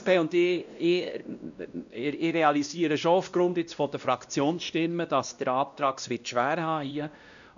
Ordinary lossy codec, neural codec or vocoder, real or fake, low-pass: none; codec, 16 kHz, 1 kbps, X-Codec, WavLM features, trained on Multilingual LibriSpeech; fake; 7.2 kHz